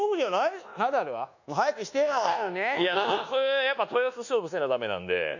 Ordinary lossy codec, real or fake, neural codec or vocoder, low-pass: none; fake; codec, 24 kHz, 1.2 kbps, DualCodec; 7.2 kHz